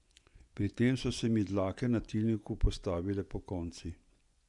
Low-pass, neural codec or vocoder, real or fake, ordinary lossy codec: 10.8 kHz; vocoder, 24 kHz, 100 mel bands, Vocos; fake; none